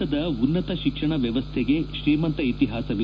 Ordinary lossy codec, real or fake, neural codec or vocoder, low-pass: none; real; none; none